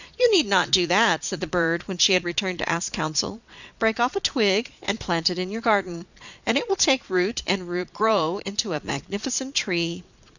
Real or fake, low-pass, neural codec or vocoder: fake; 7.2 kHz; vocoder, 22.05 kHz, 80 mel bands, Vocos